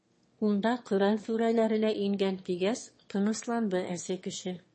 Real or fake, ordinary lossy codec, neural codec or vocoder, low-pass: fake; MP3, 32 kbps; autoencoder, 22.05 kHz, a latent of 192 numbers a frame, VITS, trained on one speaker; 9.9 kHz